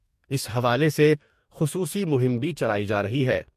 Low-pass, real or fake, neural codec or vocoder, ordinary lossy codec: 14.4 kHz; fake; codec, 44.1 kHz, 2.6 kbps, DAC; MP3, 64 kbps